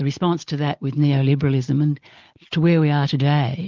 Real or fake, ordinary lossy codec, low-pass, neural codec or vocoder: real; Opus, 24 kbps; 7.2 kHz; none